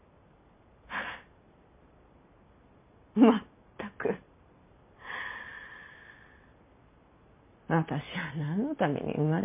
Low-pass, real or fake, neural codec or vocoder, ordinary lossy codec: 3.6 kHz; real; none; MP3, 32 kbps